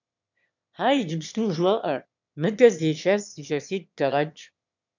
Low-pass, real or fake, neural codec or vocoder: 7.2 kHz; fake; autoencoder, 22.05 kHz, a latent of 192 numbers a frame, VITS, trained on one speaker